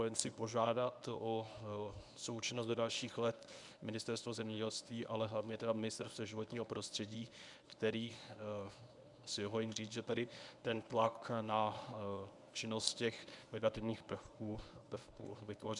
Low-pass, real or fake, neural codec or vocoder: 10.8 kHz; fake; codec, 24 kHz, 0.9 kbps, WavTokenizer, medium speech release version 1